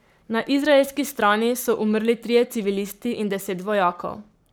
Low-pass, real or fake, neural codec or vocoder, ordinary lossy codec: none; fake; codec, 44.1 kHz, 7.8 kbps, Pupu-Codec; none